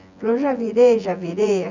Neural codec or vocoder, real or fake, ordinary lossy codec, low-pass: vocoder, 24 kHz, 100 mel bands, Vocos; fake; none; 7.2 kHz